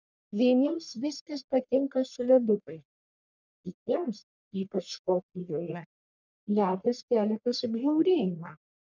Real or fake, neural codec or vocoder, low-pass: fake; codec, 44.1 kHz, 1.7 kbps, Pupu-Codec; 7.2 kHz